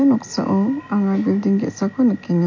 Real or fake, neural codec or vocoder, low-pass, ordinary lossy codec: real; none; 7.2 kHz; MP3, 48 kbps